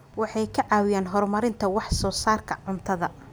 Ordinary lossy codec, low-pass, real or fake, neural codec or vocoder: none; none; real; none